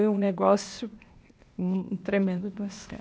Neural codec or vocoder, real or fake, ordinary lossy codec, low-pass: codec, 16 kHz, 0.8 kbps, ZipCodec; fake; none; none